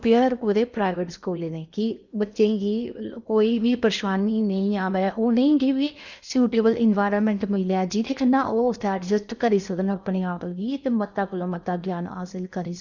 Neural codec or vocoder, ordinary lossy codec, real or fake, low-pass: codec, 16 kHz in and 24 kHz out, 0.8 kbps, FocalCodec, streaming, 65536 codes; none; fake; 7.2 kHz